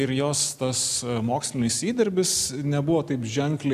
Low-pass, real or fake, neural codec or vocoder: 14.4 kHz; real; none